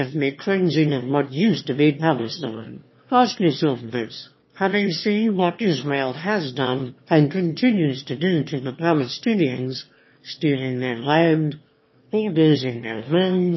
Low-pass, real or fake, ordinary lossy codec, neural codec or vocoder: 7.2 kHz; fake; MP3, 24 kbps; autoencoder, 22.05 kHz, a latent of 192 numbers a frame, VITS, trained on one speaker